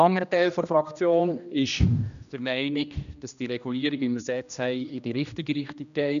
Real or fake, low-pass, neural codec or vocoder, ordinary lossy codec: fake; 7.2 kHz; codec, 16 kHz, 1 kbps, X-Codec, HuBERT features, trained on general audio; MP3, 96 kbps